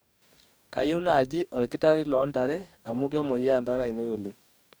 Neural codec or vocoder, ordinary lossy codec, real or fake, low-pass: codec, 44.1 kHz, 2.6 kbps, DAC; none; fake; none